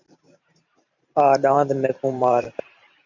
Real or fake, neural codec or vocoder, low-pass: fake; vocoder, 44.1 kHz, 128 mel bands every 512 samples, BigVGAN v2; 7.2 kHz